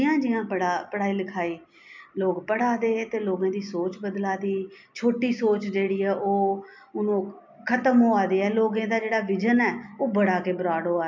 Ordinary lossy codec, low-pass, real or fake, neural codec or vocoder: MP3, 64 kbps; 7.2 kHz; real; none